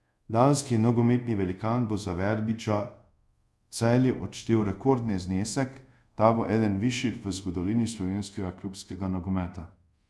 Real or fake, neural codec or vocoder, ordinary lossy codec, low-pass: fake; codec, 24 kHz, 0.5 kbps, DualCodec; none; none